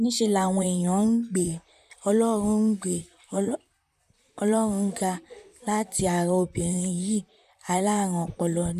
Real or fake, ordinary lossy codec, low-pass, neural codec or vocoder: fake; none; 14.4 kHz; vocoder, 44.1 kHz, 128 mel bands, Pupu-Vocoder